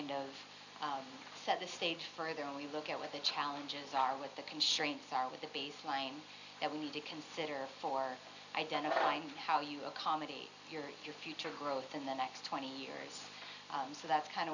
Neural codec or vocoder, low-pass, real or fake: none; 7.2 kHz; real